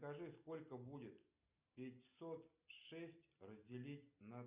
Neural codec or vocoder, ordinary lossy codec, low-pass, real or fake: none; Opus, 64 kbps; 3.6 kHz; real